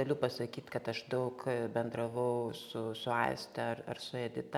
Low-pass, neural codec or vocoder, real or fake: 19.8 kHz; none; real